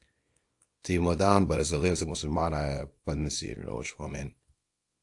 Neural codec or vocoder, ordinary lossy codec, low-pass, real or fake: codec, 24 kHz, 0.9 kbps, WavTokenizer, small release; AAC, 64 kbps; 10.8 kHz; fake